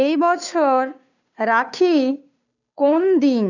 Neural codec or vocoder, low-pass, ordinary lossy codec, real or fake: codec, 16 kHz, 2 kbps, FunCodec, trained on Chinese and English, 25 frames a second; 7.2 kHz; none; fake